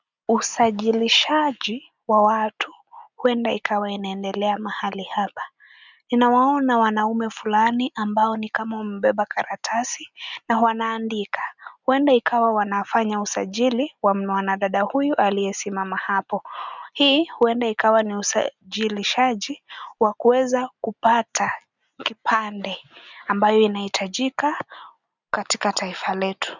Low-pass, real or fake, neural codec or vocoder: 7.2 kHz; real; none